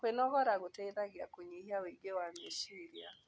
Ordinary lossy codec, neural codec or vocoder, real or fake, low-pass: none; none; real; none